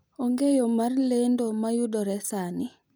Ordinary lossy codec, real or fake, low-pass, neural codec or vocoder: none; real; none; none